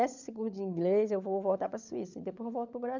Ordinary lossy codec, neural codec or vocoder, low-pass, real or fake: none; codec, 16 kHz, 16 kbps, FunCodec, trained on LibriTTS, 50 frames a second; 7.2 kHz; fake